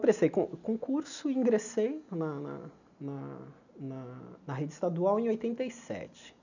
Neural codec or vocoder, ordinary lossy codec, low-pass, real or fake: none; none; 7.2 kHz; real